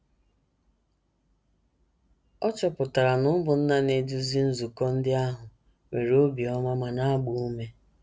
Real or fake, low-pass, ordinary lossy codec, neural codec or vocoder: real; none; none; none